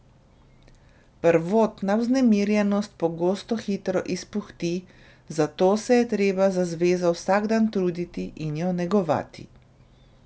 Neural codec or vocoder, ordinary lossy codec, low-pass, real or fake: none; none; none; real